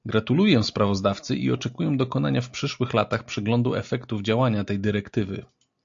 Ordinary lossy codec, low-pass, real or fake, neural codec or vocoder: AAC, 64 kbps; 7.2 kHz; real; none